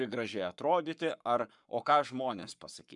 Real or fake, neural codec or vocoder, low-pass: fake; codec, 44.1 kHz, 7.8 kbps, Pupu-Codec; 10.8 kHz